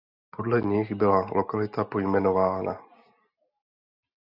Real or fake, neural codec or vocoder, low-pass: real; none; 5.4 kHz